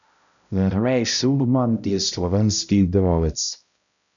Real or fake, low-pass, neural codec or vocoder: fake; 7.2 kHz; codec, 16 kHz, 0.5 kbps, X-Codec, HuBERT features, trained on balanced general audio